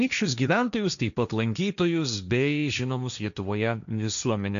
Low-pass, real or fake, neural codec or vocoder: 7.2 kHz; fake; codec, 16 kHz, 1.1 kbps, Voila-Tokenizer